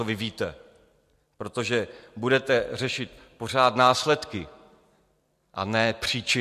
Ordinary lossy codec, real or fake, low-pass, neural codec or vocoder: MP3, 64 kbps; real; 14.4 kHz; none